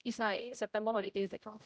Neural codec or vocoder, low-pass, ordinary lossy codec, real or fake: codec, 16 kHz, 0.5 kbps, X-Codec, HuBERT features, trained on general audio; none; none; fake